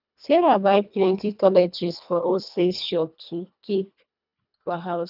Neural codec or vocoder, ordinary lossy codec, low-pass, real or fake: codec, 24 kHz, 1.5 kbps, HILCodec; none; 5.4 kHz; fake